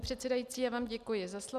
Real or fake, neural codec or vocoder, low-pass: real; none; 14.4 kHz